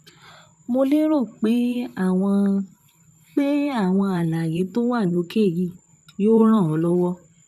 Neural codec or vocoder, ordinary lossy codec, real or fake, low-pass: vocoder, 44.1 kHz, 128 mel bands, Pupu-Vocoder; none; fake; 14.4 kHz